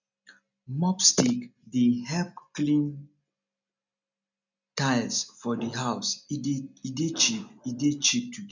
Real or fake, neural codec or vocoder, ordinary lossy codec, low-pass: real; none; none; 7.2 kHz